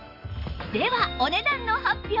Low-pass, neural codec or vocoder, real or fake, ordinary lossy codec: 5.4 kHz; none; real; none